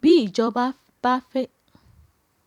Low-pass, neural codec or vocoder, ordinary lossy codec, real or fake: 19.8 kHz; vocoder, 44.1 kHz, 128 mel bands every 256 samples, BigVGAN v2; none; fake